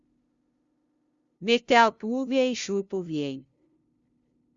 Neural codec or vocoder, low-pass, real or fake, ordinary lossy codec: codec, 16 kHz, 0.5 kbps, FunCodec, trained on LibriTTS, 25 frames a second; 7.2 kHz; fake; Opus, 64 kbps